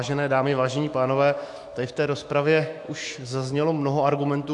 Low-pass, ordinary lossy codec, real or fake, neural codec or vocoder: 10.8 kHz; AAC, 64 kbps; fake; autoencoder, 48 kHz, 128 numbers a frame, DAC-VAE, trained on Japanese speech